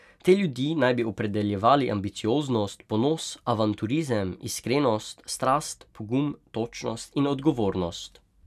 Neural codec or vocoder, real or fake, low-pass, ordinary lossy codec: none; real; 14.4 kHz; none